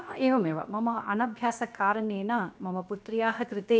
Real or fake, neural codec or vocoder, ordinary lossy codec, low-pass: fake; codec, 16 kHz, 0.7 kbps, FocalCodec; none; none